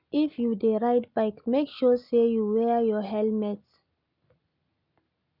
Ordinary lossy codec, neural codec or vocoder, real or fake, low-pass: none; none; real; 5.4 kHz